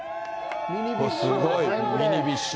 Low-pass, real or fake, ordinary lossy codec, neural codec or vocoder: none; real; none; none